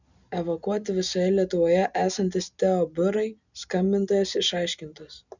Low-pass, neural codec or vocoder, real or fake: 7.2 kHz; none; real